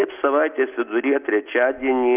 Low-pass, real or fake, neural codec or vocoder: 3.6 kHz; real; none